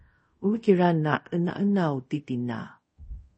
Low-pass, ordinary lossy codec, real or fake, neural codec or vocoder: 10.8 kHz; MP3, 32 kbps; fake; codec, 24 kHz, 0.5 kbps, DualCodec